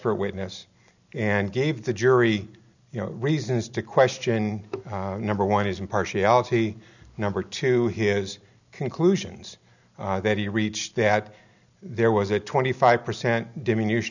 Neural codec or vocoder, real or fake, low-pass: none; real; 7.2 kHz